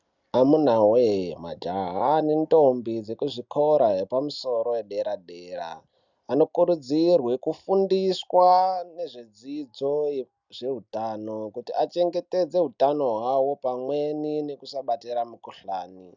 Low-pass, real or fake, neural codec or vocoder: 7.2 kHz; real; none